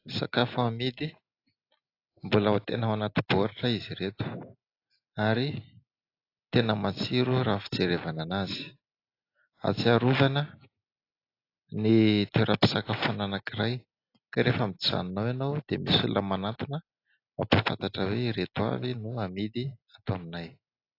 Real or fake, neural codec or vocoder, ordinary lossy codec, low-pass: real; none; AAC, 32 kbps; 5.4 kHz